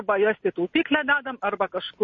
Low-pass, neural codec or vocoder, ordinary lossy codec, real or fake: 7.2 kHz; none; MP3, 32 kbps; real